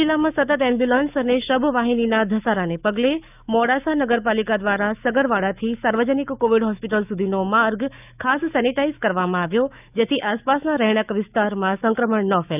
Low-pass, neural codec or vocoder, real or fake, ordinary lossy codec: 3.6 kHz; autoencoder, 48 kHz, 128 numbers a frame, DAC-VAE, trained on Japanese speech; fake; none